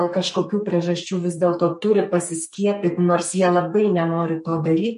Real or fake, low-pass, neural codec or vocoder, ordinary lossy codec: fake; 14.4 kHz; codec, 44.1 kHz, 2.6 kbps, SNAC; MP3, 48 kbps